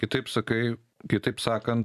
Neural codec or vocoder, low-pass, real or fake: vocoder, 44.1 kHz, 128 mel bands every 256 samples, BigVGAN v2; 14.4 kHz; fake